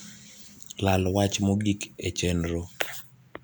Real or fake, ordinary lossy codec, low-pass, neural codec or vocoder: real; none; none; none